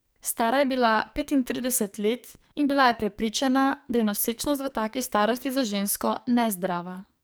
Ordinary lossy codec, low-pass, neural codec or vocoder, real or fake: none; none; codec, 44.1 kHz, 2.6 kbps, SNAC; fake